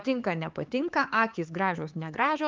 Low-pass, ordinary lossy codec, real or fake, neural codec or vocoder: 7.2 kHz; Opus, 32 kbps; fake; codec, 16 kHz, 4 kbps, X-Codec, HuBERT features, trained on LibriSpeech